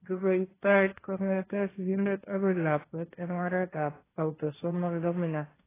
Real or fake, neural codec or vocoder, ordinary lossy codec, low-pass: fake; codec, 16 kHz, 1.1 kbps, Voila-Tokenizer; AAC, 16 kbps; 3.6 kHz